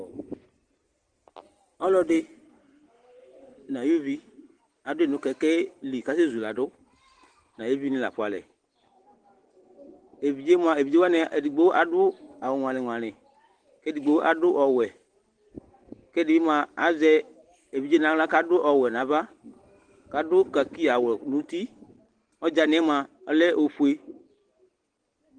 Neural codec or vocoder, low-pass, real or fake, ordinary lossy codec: none; 9.9 kHz; real; Opus, 16 kbps